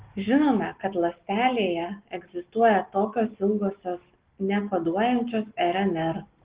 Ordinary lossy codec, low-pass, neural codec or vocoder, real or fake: Opus, 16 kbps; 3.6 kHz; none; real